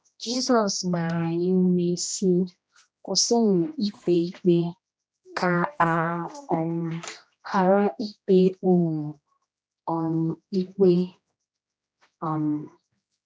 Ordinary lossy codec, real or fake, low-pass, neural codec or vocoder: none; fake; none; codec, 16 kHz, 1 kbps, X-Codec, HuBERT features, trained on general audio